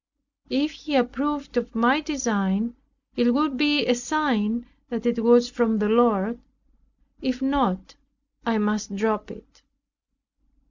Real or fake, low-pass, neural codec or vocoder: real; 7.2 kHz; none